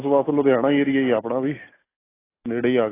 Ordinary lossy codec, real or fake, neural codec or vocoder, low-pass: AAC, 16 kbps; real; none; 3.6 kHz